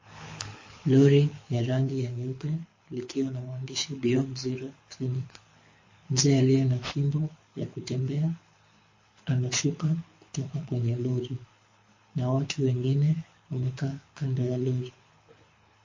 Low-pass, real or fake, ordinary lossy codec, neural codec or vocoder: 7.2 kHz; fake; MP3, 32 kbps; codec, 24 kHz, 6 kbps, HILCodec